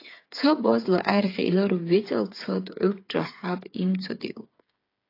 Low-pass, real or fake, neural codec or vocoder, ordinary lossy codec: 5.4 kHz; fake; codec, 16 kHz, 8 kbps, FreqCodec, smaller model; AAC, 32 kbps